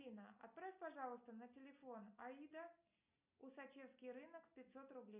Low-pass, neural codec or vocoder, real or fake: 3.6 kHz; none; real